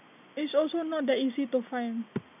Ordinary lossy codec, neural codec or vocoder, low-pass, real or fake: none; none; 3.6 kHz; real